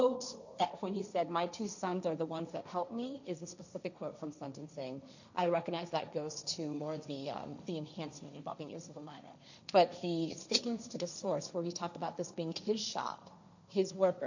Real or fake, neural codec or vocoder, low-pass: fake; codec, 16 kHz, 1.1 kbps, Voila-Tokenizer; 7.2 kHz